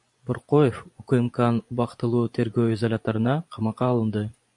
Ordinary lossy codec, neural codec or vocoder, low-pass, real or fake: AAC, 64 kbps; vocoder, 24 kHz, 100 mel bands, Vocos; 10.8 kHz; fake